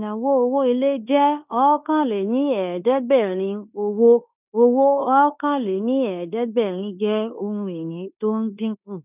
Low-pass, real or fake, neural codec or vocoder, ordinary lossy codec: 3.6 kHz; fake; codec, 16 kHz in and 24 kHz out, 0.9 kbps, LongCat-Audio-Codec, fine tuned four codebook decoder; none